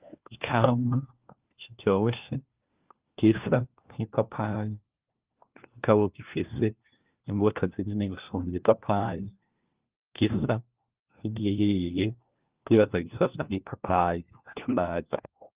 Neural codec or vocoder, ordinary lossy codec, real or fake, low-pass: codec, 16 kHz, 1 kbps, FunCodec, trained on LibriTTS, 50 frames a second; Opus, 32 kbps; fake; 3.6 kHz